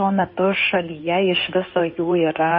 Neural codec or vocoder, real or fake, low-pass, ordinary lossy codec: codec, 16 kHz in and 24 kHz out, 2.2 kbps, FireRedTTS-2 codec; fake; 7.2 kHz; MP3, 24 kbps